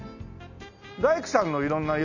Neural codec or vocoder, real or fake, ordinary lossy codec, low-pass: none; real; none; 7.2 kHz